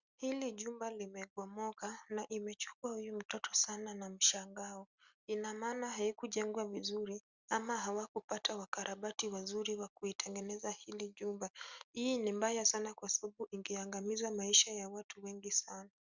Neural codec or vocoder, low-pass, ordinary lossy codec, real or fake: none; 7.2 kHz; Opus, 64 kbps; real